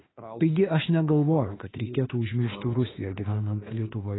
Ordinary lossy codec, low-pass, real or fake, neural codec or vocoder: AAC, 16 kbps; 7.2 kHz; fake; autoencoder, 48 kHz, 32 numbers a frame, DAC-VAE, trained on Japanese speech